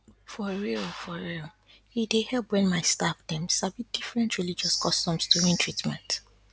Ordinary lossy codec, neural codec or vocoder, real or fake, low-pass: none; none; real; none